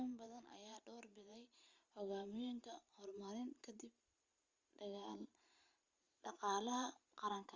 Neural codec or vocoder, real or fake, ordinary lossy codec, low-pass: vocoder, 44.1 kHz, 128 mel bands every 512 samples, BigVGAN v2; fake; Opus, 32 kbps; 7.2 kHz